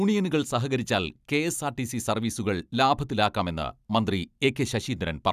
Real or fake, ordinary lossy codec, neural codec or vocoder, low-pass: real; none; none; 14.4 kHz